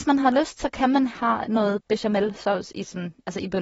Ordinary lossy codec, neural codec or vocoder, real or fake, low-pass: AAC, 24 kbps; vocoder, 44.1 kHz, 128 mel bands, Pupu-Vocoder; fake; 19.8 kHz